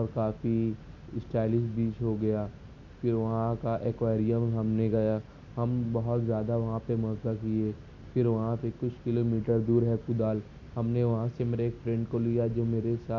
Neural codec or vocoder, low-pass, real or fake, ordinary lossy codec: none; 7.2 kHz; real; none